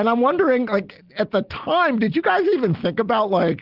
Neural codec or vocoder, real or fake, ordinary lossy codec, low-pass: none; real; Opus, 16 kbps; 5.4 kHz